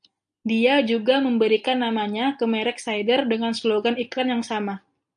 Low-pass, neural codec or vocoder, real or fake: 9.9 kHz; none; real